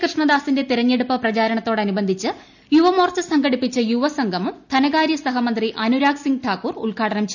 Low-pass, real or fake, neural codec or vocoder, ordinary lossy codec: 7.2 kHz; real; none; none